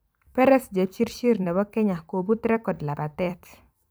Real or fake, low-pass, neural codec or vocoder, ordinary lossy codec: fake; none; vocoder, 44.1 kHz, 128 mel bands every 256 samples, BigVGAN v2; none